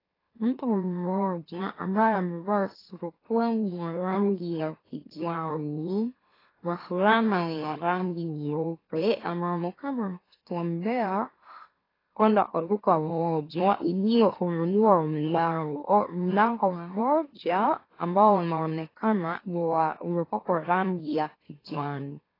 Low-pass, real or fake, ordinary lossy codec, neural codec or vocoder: 5.4 kHz; fake; AAC, 24 kbps; autoencoder, 44.1 kHz, a latent of 192 numbers a frame, MeloTTS